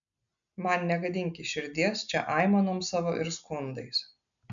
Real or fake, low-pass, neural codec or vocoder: real; 7.2 kHz; none